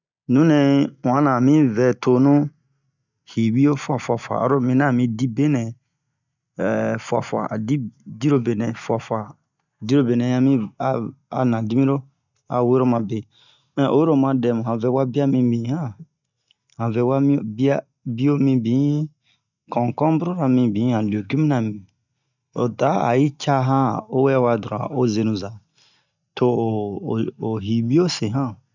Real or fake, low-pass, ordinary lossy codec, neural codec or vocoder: real; 7.2 kHz; none; none